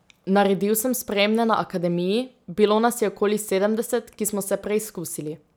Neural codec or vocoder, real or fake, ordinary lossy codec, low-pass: none; real; none; none